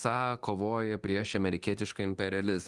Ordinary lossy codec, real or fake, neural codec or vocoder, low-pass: Opus, 24 kbps; fake; codec, 24 kHz, 0.9 kbps, DualCodec; 10.8 kHz